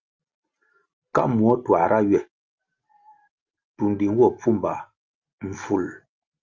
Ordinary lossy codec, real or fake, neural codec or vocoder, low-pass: Opus, 24 kbps; real; none; 7.2 kHz